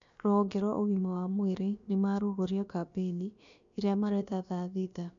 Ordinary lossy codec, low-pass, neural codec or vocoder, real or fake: AAC, 64 kbps; 7.2 kHz; codec, 16 kHz, about 1 kbps, DyCAST, with the encoder's durations; fake